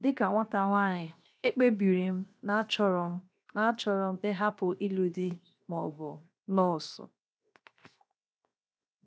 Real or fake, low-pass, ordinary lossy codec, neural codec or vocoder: fake; none; none; codec, 16 kHz, 0.7 kbps, FocalCodec